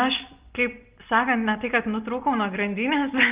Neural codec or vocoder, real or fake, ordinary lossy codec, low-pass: none; real; Opus, 32 kbps; 3.6 kHz